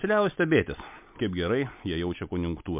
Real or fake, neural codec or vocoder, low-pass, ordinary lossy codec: real; none; 3.6 kHz; MP3, 32 kbps